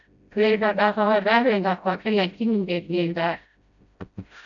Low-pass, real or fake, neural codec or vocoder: 7.2 kHz; fake; codec, 16 kHz, 0.5 kbps, FreqCodec, smaller model